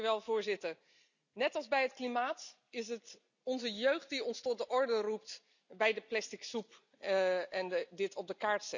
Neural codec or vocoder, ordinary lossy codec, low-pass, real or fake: none; none; 7.2 kHz; real